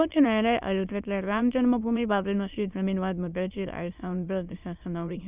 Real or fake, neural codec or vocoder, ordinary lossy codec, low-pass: fake; autoencoder, 22.05 kHz, a latent of 192 numbers a frame, VITS, trained on many speakers; Opus, 64 kbps; 3.6 kHz